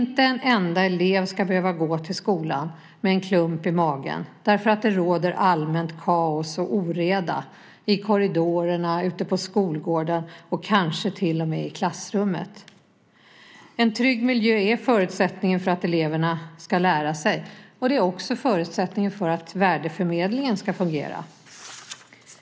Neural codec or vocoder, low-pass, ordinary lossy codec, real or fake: none; none; none; real